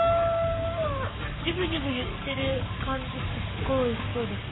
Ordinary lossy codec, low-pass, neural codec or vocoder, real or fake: AAC, 16 kbps; 7.2 kHz; codec, 44.1 kHz, 7.8 kbps, Pupu-Codec; fake